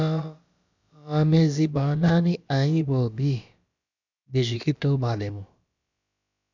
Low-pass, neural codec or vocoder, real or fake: 7.2 kHz; codec, 16 kHz, about 1 kbps, DyCAST, with the encoder's durations; fake